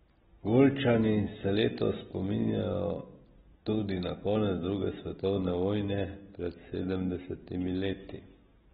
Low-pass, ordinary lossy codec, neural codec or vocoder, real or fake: 7.2 kHz; AAC, 16 kbps; none; real